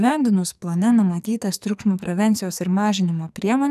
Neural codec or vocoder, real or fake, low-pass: codec, 44.1 kHz, 2.6 kbps, SNAC; fake; 14.4 kHz